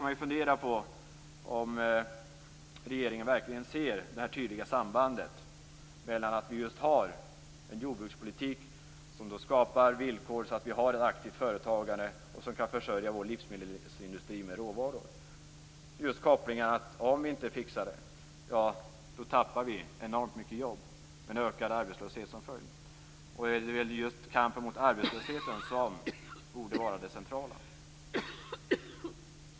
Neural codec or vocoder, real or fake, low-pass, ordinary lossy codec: none; real; none; none